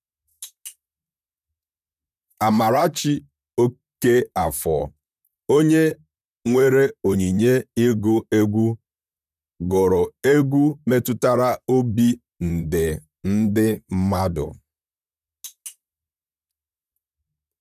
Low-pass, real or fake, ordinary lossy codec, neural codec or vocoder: 14.4 kHz; fake; none; vocoder, 44.1 kHz, 128 mel bands, Pupu-Vocoder